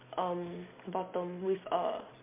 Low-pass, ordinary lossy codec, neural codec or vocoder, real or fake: 3.6 kHz; MP3, 32 kbps; none; real